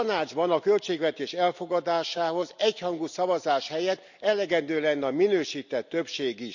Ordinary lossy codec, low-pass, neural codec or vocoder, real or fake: none; 7.2 kHz; none; real